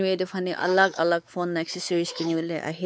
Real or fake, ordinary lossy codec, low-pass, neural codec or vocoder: fake; none; none; codec, 16 kHz, 4 kbps, X-Codec, WavLM features, trained on Multilingual LibriSpeech